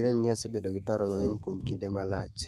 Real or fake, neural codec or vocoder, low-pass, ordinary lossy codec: fake; codec, 32 kHz, 1.9 kbps, SNAC; 14.4 kHz; none